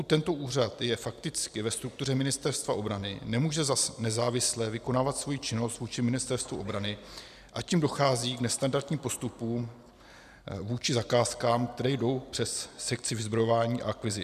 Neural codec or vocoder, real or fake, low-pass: none; real; 14.4 kHz